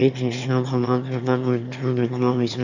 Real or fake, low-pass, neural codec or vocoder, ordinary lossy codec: fake; 7.2 kHz; autoencoder, 22.05 kHz, a latent of 192 numbers a frame, VITS, trained on one speaker; none